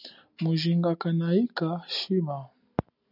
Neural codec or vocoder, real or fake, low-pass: none; real; 5.4 kHz